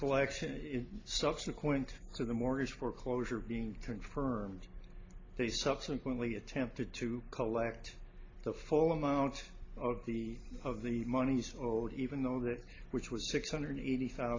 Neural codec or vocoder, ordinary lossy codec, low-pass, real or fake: none; AAC, 32 kbps; 7.2 kHz; real